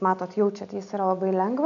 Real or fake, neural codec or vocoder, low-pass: real; none; 7.2 kHz